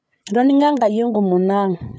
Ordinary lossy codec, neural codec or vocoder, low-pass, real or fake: none; codec, 16 kHz, 8 kbps, FreqCodec, larger model; none; fake